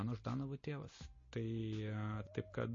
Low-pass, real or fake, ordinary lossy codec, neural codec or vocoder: 7.2 kHz; real; MP3, 32 kbps; none